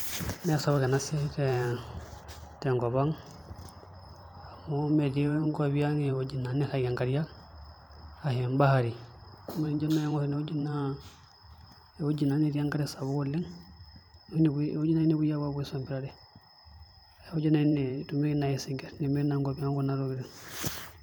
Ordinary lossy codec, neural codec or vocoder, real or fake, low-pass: none; vocoder, 44.1 kHz, 128 mel bands every 512 samples, BigVGAN v2; fake; none